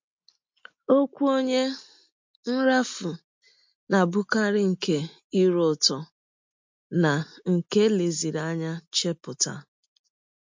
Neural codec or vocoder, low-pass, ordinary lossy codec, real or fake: none; 7.2 kHz; MP3, 48 kbps; real